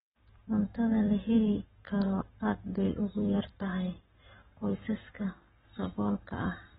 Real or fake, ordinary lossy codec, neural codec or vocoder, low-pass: fake; AAC, 16 kbps; codec, 44.1 kHz, 7.8 kbps, Pupu-Codec; 19.8 kHz